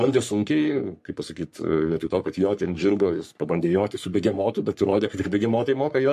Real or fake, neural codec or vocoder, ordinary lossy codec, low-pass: fake; codec, 44.1 kHz, 3.4 kbps, Pupu-Codec; MP3, 64 kbps; 14.4 kHz